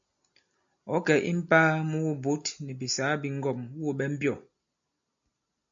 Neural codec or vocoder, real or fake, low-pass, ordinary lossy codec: none; real; 7.2 kHz; AAC, 64 kbps